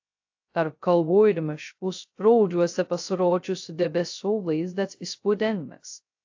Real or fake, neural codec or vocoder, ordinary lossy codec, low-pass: fake; codec, 16 kHz, 0.2 kbps, FocalCodec; AAC, 48 kbps; 7.2 kHz